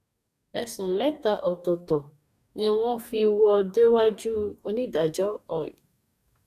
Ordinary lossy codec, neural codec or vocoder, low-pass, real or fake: none; codec, 44.1 kHz, 2.6 kbps, DAC; 14.4 kHz; fake